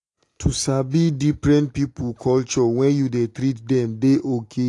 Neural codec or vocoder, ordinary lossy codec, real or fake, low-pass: none; AAC, 48 kbps; real; 10.8 kHz